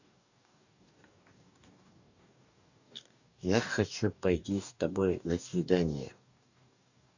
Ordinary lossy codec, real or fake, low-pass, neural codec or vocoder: none; fake; 7.2 kHz; codec, 44.1 kHz, 2.6 kbps, DAC